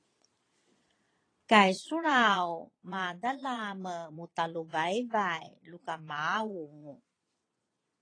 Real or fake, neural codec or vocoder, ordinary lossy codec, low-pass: fake; vocoder, 22.05 kHz, 80 mel bands, Vocos; AAC, 32 kbps; 9.9 kHz